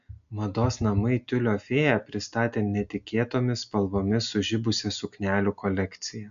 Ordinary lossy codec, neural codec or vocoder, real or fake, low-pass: AAC, 96 kbps; none; real; 7.2 kHz